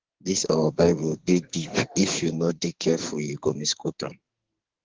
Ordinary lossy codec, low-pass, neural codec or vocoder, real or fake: Opus, 16 kbps; 7.2 kHz; codec, 44.1 kHz, 2.6 kbps, SNAC; fake